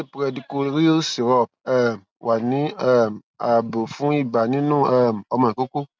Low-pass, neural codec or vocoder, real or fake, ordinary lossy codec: none; none; real; none